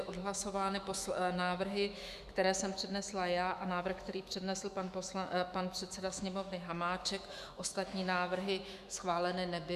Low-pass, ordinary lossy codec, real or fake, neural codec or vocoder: 14.4 kHz; Opus, 64 kbps; fake; autoencoder, 48 kHz, 128 numbers a frame, DAC-VAE, trained on Japanese speech